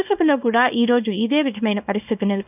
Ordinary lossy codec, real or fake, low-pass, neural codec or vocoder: none; fake; 3.6 kHz; codec, 24 kHz, 0.9 kbps, WavTokenizer, small release